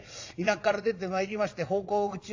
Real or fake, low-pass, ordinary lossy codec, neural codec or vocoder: fake; 7.2 kHz; AAC, 48 kbps; vocoder, 22.05 kHz, 80 mel bands, Vocos